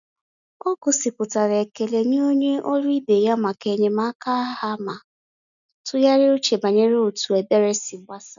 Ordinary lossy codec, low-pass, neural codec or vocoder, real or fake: none; 7.2 kHz; none; real